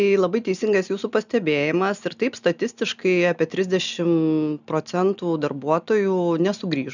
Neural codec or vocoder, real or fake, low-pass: none; real; 7.2 kHz